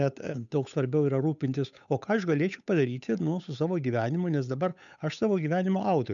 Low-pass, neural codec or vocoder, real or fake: 7.2 kHz; codec, 16 kHz, 8 kbps, FunCodec, trained on Chinese and English, 25 frames a second; fake